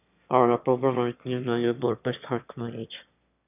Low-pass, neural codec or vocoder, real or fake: 3.6 kHz; autoencoder, 22.05 kHz, a latent of 192 numbers a frame, VITS, trained on one speaker; fake